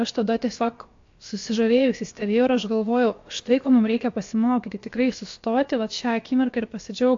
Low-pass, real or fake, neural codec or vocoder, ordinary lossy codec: 7.2 kHz; fake; codec, 16 kHz, about 1 kbps, DyCAST, with the encoder's durations; AAC, 48 kbps